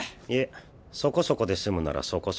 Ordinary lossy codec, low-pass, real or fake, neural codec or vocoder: none; none; real; none